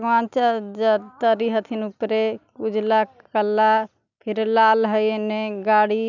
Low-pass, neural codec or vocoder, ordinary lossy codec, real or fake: 7.2 kHz; none; none; real